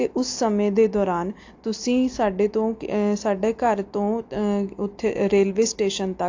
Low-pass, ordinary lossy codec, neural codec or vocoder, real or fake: 7.2 kHz; AAC, 48 kbps; none; real